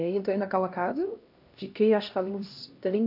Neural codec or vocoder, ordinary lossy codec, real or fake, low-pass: codec, 16 kHz, 1 kbps, X-Codec, HuBERT features, trained on LibriSpeech; none; fake; 5.4 kHz